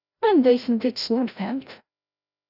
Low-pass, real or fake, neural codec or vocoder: 5.4 kHz; fake; codec, 16 kHz, 0.5 kbps, FreqCodec, larger model